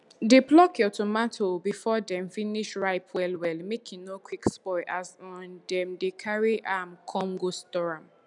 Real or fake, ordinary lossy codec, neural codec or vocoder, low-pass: real; none; none; 10.8 kHz